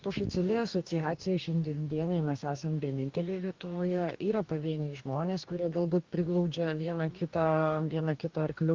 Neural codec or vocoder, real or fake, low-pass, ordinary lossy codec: codec, 44.1 kHz, 2.6 kbps, DAC; fake; 7.2 kHz; Opus, 24 kbps